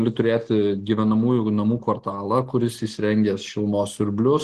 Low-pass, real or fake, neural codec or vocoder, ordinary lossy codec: 14.4 kHz; real; none; Opus, 16 kbps